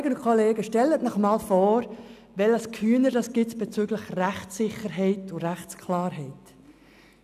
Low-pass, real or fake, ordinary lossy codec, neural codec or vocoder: 14.4 kHz; real; none; none